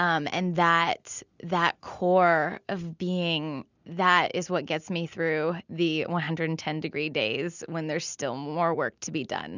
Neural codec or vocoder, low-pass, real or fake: none; 7.2 kHz; real